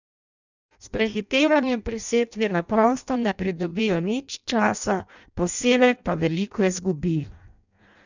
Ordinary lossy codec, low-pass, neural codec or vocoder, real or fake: none; 7.2 kHz; codec, 16 kHz in and 24 kHz out, 0.6 kbps, FireRedTTS-2 codec; fake